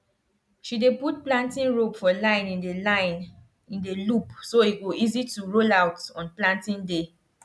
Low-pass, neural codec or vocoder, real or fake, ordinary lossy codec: none; none; real; none